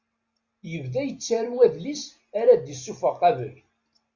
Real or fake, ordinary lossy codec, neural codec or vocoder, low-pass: real; Opus, 64 kbps; none; 7.2 kHz